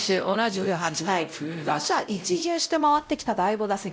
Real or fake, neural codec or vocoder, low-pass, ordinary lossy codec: fake; codec, 16 kHz, 0.5 kbps, X-Codec, WavLM features, trained on Multilingual LibriSpeech; none; none